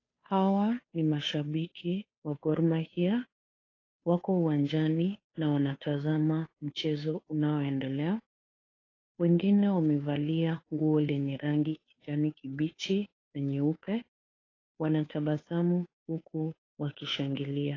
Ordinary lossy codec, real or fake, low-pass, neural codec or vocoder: AAC, 32 kbps; fake; 7.2 kHz; codec, 16 kHz, 2 kbps, FunCodec, trained on Chinese and English, 25 frames a second